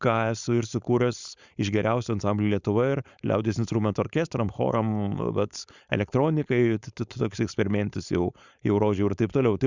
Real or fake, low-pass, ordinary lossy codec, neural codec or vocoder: fake; 7.2 kHz; Opus, 64 kbps; codec, 16 kHz, 4.8 kbps, FACodec